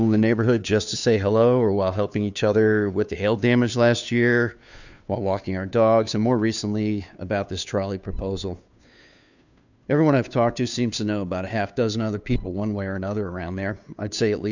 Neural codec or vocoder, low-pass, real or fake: codec, 16 kHz, 2 kbps, FunCodec, trained on Chinese and English, 25 frames a second; 7.2 kHz; fake